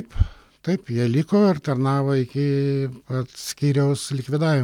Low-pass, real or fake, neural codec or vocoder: 19.8 kHz; real; none